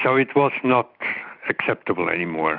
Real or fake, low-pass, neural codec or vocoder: real; 5.4 kHz; none